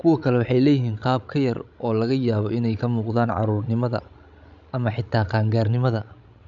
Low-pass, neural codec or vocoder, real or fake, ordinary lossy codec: 7.2 kHz; codec, 16 kHz, 16 kbps, FreqCodec, larger model; fake; none